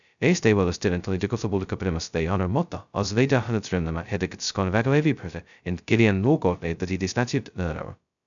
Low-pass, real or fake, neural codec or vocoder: 7.2 kHz; fake; codec, 16 kHz, 0.2 kbps, FocalCodec